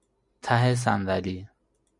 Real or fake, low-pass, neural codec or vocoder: real; 10.8 kHz; none